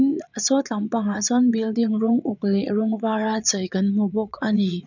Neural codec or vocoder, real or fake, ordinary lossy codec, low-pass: vocoder, 22.05 kHz, 80 mel bands, Vocos; fake; none; 7.2 kHz